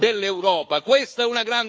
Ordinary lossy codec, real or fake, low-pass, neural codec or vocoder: none; fake; none; codec, 16 kHz, 4 kbps, FunCodec, trained on Chinese and English, 50 frames a second